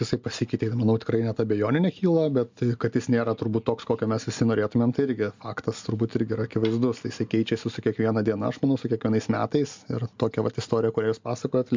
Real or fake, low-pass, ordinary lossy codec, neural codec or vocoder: real; 7.2 kHz; MP3, 64 kbps; none